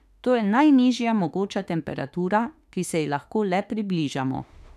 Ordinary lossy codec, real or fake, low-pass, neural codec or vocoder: none; fake; 14.4 kHz; autoencoder, 48 kHz, 32 numbers a frame, DAC-VAE, trained on Japanese speech